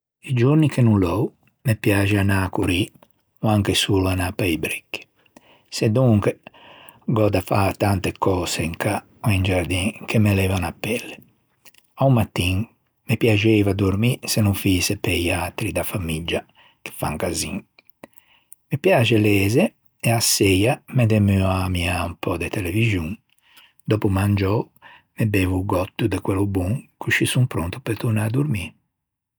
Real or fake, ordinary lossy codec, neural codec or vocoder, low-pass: real; none; none; none